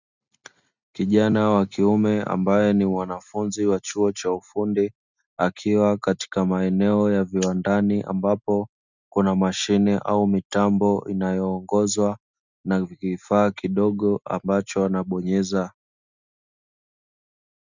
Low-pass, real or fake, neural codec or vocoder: 7.2 kHz; real; none